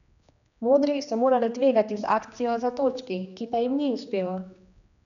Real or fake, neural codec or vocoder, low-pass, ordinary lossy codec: fake; codec, 16 kHz, 2 kbps, X-Codec, HuBERT features, trained on general audio; 7.2 kHz; none